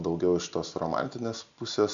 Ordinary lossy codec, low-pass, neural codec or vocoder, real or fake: MP3, 64 kbps; 7.2 kHz; none; real